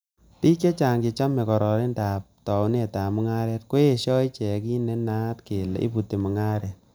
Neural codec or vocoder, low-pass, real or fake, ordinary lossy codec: none; none; real; none